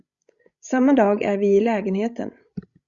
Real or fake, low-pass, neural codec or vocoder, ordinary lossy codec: fake; 7.2 kHz; codec, 16 kHz, 16 kbps, FreqCodec, larger model; Opus, 64 kbps